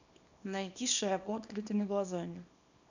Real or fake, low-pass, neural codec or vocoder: fake; 7.2 kHz; codec, 24 kHz, 0.9 kbps, WavTokenizer, small release